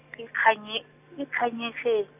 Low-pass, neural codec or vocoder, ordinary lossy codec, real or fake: 3.6 kHz; none; none; real